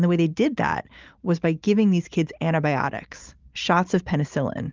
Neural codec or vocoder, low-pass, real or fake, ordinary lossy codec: none; 7.2 kHz; real; Opus, 32 kbps